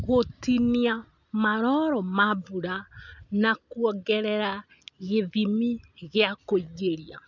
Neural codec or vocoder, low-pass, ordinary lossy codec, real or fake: none; 7.2 kHz; none; real